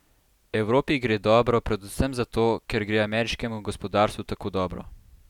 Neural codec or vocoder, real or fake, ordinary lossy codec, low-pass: none; real; none; 19.8 kHz